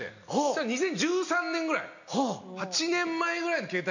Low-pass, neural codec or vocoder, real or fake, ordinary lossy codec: 7.2 kHz; none; real; none